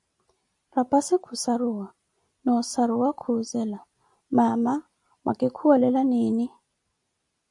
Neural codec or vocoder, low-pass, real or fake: none; 10.8 kHz; real